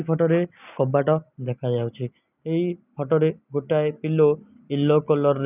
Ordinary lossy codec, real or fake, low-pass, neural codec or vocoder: none; real; 3.6 kHz; none